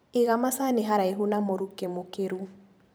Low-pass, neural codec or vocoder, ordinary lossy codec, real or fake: none; vocoder, 44.1 kHz, 128 mel bands every 512 samples, BigVGAN v2; none; fake